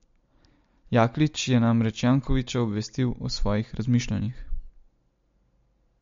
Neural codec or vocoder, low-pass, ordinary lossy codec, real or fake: none; 7.2 kHz; MP3, 48 kbps; real